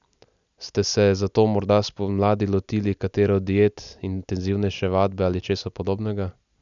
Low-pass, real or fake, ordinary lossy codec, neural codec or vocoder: 7.2 kHz; real; none; none